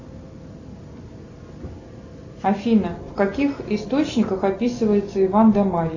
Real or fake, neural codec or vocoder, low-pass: real; none; 7.2 kHz